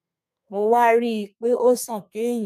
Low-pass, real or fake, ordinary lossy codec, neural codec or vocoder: 14.4 kHz; fake; none; codec, 32 kHz, 1.9 kbps, SNAC